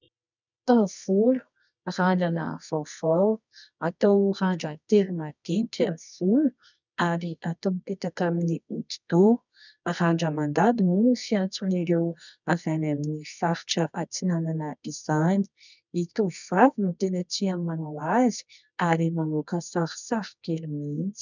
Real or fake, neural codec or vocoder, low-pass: fake; codec, 24 kHz, 0.9 kbps, WavTokenizer, medium music audio release; 7.2 kHz